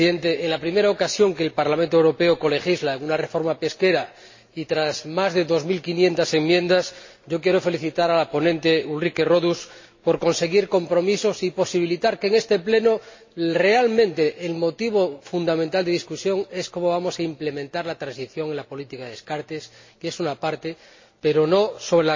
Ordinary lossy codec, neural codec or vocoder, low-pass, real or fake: MP3, 32 kbps; none; 7.2 kHz; real